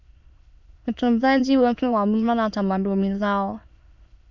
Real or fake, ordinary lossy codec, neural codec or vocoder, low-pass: fake; MP3, 64 kbps; autoencoder, 22.05 kHz, a latent of 192 numbers a frame, VITS, trained on many speakers; 7.2 kHz